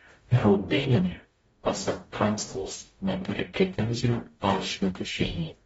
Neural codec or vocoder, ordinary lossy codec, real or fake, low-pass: codec, 44.1 kHz, 0.9 kbps, DAC; AAC, 24 kbps; fake; 19.8 kHz